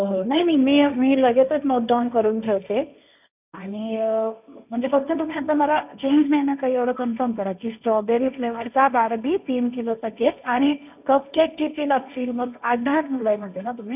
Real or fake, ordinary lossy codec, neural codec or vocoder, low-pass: fake; none; codec, 16 kHz, 1.1 kbps, Voila-Tokenizer; 3.6 kHz